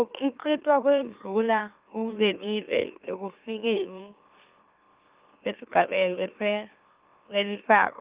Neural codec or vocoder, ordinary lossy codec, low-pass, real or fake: autoencoder, 44.1 kHz, a latent of 192 numbers a frame, MeloTTS; Opus, 24 kbps; 3.6 kHz; fake